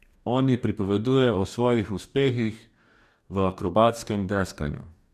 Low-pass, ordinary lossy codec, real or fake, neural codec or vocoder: 14.4 kHz; none; fake; codec, 44.1 kHz, 2.6 kbps, DAC